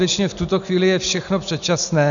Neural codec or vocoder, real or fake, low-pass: none; real; 7.2 kHz